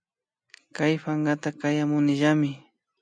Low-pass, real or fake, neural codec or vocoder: 9.9 kHz; real; none